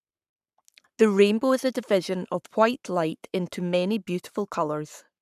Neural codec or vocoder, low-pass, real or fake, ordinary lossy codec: codec, 44.1 kHz, 7.8 kbps, Pupu-Codec; 14.4 kHz; fake; none